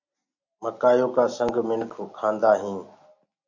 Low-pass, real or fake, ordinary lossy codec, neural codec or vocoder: 7.2 kHz; real; AAC, 48 kbps; none